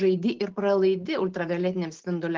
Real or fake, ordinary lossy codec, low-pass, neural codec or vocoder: real; Opus, 16 kbps; 7.2 kHz; none